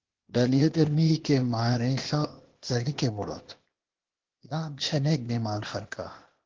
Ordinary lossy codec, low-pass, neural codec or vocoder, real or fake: Opus, 16 kbps; 7.2 kHz; codec, 16 kHz, 0.8 kbps, ZipCodec; fake